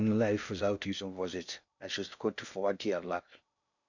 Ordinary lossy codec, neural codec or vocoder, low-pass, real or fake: none; codec, 16 kHz in and 24 kHz out, 0.6 kbps, FocalCodec, streaming, 2048 codes; 7.2 kHz; fake